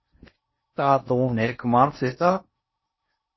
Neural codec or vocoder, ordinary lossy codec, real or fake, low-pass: codec, 16 kHz in and 24 kHz out, 0.6 kbps, FocalCodec, streaming, 4096 codes; MP3, 24 kbps; fake; 7.2 kHz